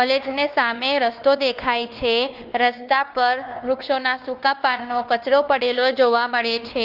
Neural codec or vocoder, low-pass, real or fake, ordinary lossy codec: codec, 16 kHz, 2 kbps, X-Codec, WavLM features, trained on Multilingual LibriSpeech; 5.4 kHz; fake; Opus, 32 kbps